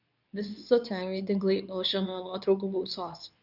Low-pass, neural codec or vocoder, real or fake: 5.4 kHz; codec, 24 kHz, 0.9 kbps, WavTokenizer, medium speech release version 2; fake